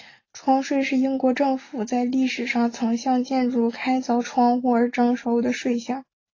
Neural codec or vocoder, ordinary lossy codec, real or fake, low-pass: none; AAC, 32 kbps; real; 7.2 kHz